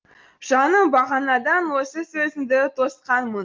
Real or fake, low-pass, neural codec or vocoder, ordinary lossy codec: real; 7.2 kHz; none; Opus, 32 kbps